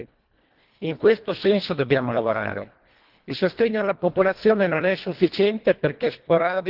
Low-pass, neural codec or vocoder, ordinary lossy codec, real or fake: 5.4 kHz; codec, 24 kHz, 1.5 kbps, HILCodec; Opus, 16 kbps; fake